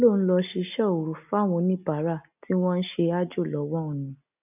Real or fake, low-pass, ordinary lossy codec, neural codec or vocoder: real; 3.6 kHz; none; none